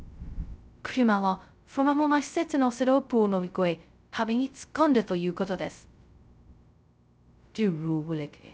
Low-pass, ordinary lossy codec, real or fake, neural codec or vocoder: none; none; fake; codec, 16 kHz, 0.2 kbps, FocalCodec